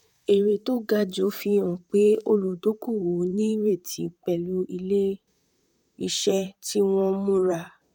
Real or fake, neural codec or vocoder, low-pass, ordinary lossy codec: fake; vocoder, 44.1 kHz, 128 mel bands, Pupu-Vocoder; 19.8 kHz; none